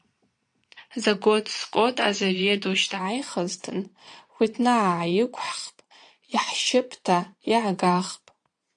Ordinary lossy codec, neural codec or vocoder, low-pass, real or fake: AAC, 64 kbps; vocoder, 22.05 kHz, 80 mel bands, Vocos; 9.9 kHz; fake